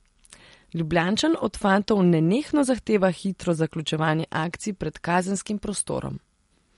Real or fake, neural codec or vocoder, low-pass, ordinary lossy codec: real; none; 19.8 kHz; MP3, 48 kbps